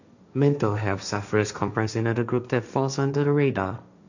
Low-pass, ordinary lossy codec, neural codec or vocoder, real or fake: 7.2 kHz; none; codec, 16 kHz, 1.1 kbps, Voila-Tokenizer; fake